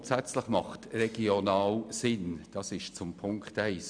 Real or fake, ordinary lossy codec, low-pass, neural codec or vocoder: real; none; 9.9 kHz; none